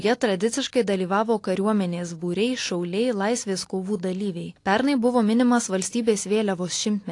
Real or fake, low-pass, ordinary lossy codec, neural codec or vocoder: real; 10.8 kHz; AAC, 48 kbps; none